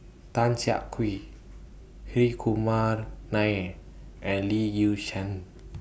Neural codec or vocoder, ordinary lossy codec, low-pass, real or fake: none; none; none; real